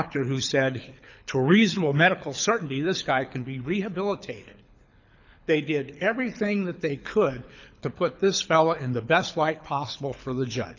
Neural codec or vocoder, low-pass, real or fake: codec, 24 kHz, 6 kbps, HILCodec; 7.2 kHz; fake